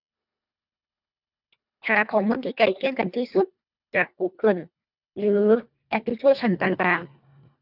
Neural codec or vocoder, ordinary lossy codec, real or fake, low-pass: codec, 24 kHz, 1.5 kbps, HILCodec; none; fake; 5.4 kHz